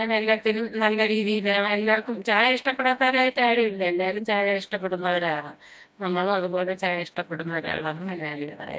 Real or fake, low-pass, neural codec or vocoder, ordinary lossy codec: fake; none; codec, 16 kHz, 1 kbps, FreqCodec, smaller model; none